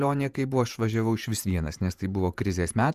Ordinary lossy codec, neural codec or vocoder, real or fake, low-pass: Opus, 64 kbps; vocoder, 48 kHz, 128 mel bands, Vocos; fake; 14.4 kHz